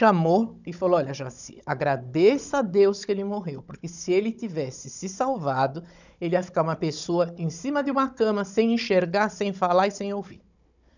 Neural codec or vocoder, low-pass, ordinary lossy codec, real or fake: codec, 16 kHz, 16 kbps, FunCodec, trained on Chinese and English, 50 frames a second; 7.2 kHz; none; fake